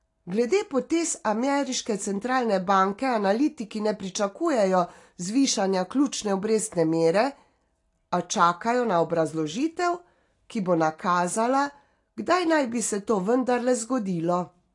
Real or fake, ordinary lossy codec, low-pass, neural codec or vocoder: real; AAC, 48 kbps; 10.8 kHz; none